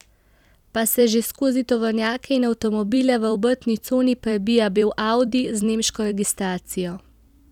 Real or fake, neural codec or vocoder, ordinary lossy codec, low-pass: fake; vocoder, 44.1 kHz, 128 mel bands every 256 samples, BigVGAN v2; none; 19.8 kHz